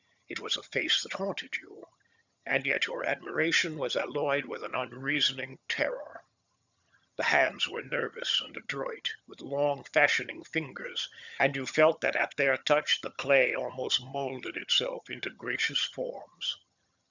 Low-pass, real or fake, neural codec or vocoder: 7.2 kHz; fake; vocoder, 22.05 kHz, 80 mel bands, HiFi-GAN